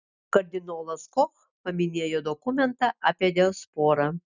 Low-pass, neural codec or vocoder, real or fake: 7.2 kHz; none; real